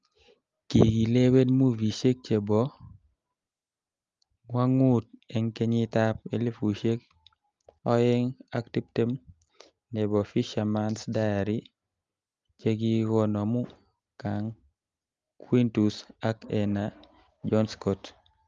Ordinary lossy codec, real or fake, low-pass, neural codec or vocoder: Opus, 32 kbps; real; 7.2 kHz; none